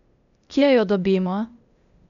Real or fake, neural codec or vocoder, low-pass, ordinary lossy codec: fake; codec, 16 kHz, 0.8 kbps, ZipCodec; 7.2 kHz; none